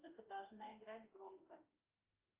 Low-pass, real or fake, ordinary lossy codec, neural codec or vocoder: 3.6 kHz; fake; Opus, 24 kbps; autoencoder, 48 kHz, 32 numbers a frame, DAC-VAE, trained on Japanese speech